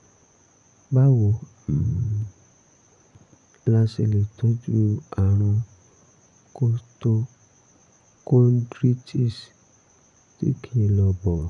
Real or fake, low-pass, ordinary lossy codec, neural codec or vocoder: fake; 10.8 kHz; none; vocoder, 24 kHz, 100 mel bands, Vocos